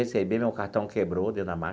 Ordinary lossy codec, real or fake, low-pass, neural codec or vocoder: none; real; none; none